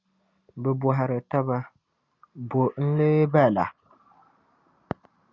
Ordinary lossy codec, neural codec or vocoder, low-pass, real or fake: Opus, 64 kbps; none; 7.2 kHz; real